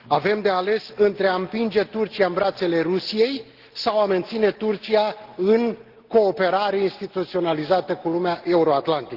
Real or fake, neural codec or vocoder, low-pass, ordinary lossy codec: real; none; 5.4 kHz; Opus, 16 kbps